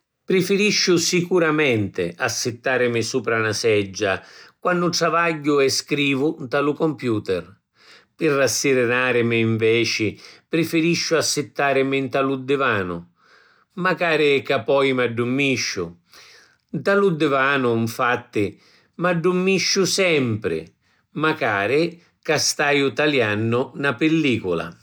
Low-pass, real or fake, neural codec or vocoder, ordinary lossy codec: none; real; none; none